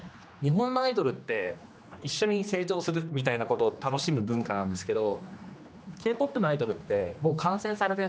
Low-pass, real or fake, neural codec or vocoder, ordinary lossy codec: none; fake; codec, 16 kHz, 2 kbps, X-Codec, HuBERT features, trained on general audio; none